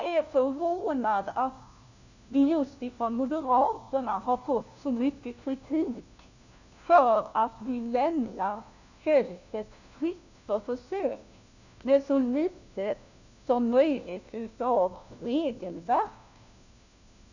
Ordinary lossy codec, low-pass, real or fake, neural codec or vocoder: none; 7.2 kHz; fake; codec, 16 kHz, 1 kbps, FunCodec, trained on LibriTTS, 50 frames a second